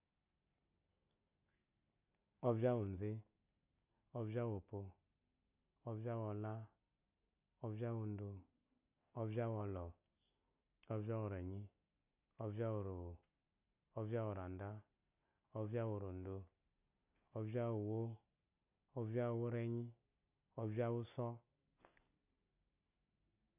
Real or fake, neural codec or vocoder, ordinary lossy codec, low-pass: real; none; none; 3.6 kHz